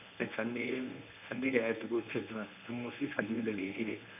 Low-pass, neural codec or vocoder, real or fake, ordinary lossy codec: 3.6 kHz; codec, 24 kHz, 0.9 kbps, WavTokenizer, medium speech release version 1; fake; none